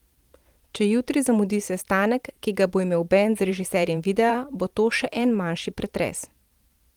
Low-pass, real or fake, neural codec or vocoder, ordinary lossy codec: 19.8 kHz; fake; vocoder, 44.1 kHz, 128 mel bands every 512 samples, BigVGAN v2; Opus, 24 kbps